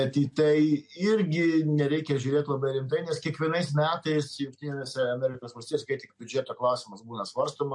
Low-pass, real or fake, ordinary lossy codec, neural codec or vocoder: 10.8 kHz; real; MP3, 48 kbps; none